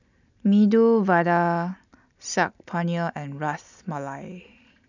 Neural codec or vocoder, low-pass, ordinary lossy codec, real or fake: none; 7.2 kHz; none; real